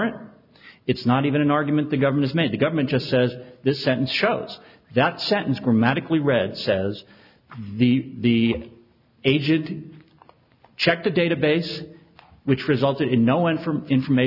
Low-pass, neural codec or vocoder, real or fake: 5.4 kHz; none; real